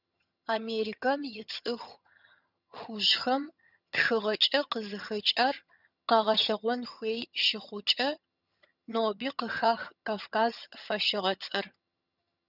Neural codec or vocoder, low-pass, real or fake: vocoder, 22.05 kHz, 80 mel bands, HiFi-GAN; 5.4 kHz; fake